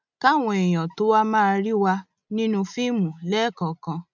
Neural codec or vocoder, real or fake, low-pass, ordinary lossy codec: none; real; 7.2 kHz; none